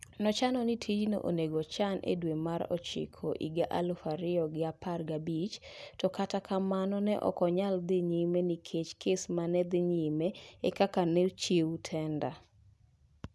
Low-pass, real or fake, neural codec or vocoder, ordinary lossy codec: none; real; none; none